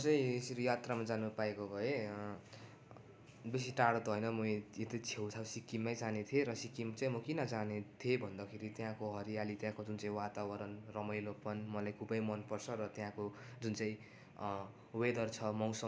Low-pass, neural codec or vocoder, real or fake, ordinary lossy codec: none; none; real; none